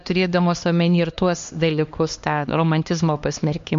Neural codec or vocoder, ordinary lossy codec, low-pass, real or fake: codec, 16 kHz, 2 kbps, X-Codec, HuBERT features, trained on LibriSpeech; MP3, 48 kbps; 7.2 kHz; fake